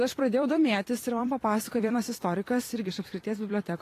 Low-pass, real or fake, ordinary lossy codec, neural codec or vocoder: 14.4 kHz; fake; AAC, 48 kbps; vocoder, 44.1 kHz, 128 mel bands every 256 samples, BigVGAN v2